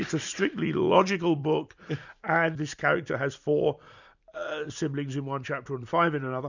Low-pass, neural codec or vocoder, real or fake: 7.2 kHz; vocoder, 44.1 kHz, 128 mel bands every 256 samples, BigVGAN v2; fake